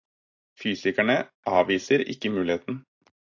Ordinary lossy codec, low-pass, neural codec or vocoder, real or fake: AAC, 48 kbps; 7.2 kHz; none; real